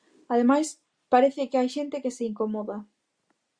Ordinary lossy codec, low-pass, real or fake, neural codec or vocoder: Opus, 64 kbps; 9.9 kHz; real; none